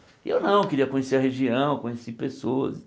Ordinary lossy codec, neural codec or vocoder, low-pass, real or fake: none; none; none; real